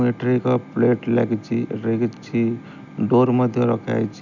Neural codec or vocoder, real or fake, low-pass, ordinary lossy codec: none; real; 7.2 kHz; none